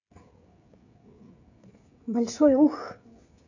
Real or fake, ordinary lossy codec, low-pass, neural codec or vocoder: fake; none; 7.2 kHz; codec, 16 kHz, 16 kbps, FreqCodec, smaller model